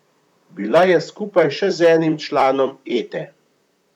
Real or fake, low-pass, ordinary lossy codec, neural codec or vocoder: fake; 19.8 kHz; none; vocoder, 44.1 kHz, 128 mel bands, Pupu-Vocoder